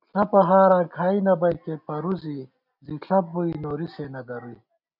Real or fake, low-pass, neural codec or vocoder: real; 5.4 kHz; none